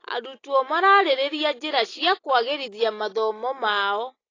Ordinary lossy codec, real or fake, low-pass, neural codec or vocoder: AAC, 32 kbps; real; 7.2 kHz; none